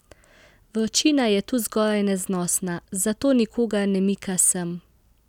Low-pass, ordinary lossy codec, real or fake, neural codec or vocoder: 19.8 kHz; none; real; none